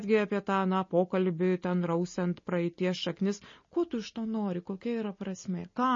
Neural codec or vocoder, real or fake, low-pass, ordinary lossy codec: none; real; 7.2 kHz; MP3, 32 kbps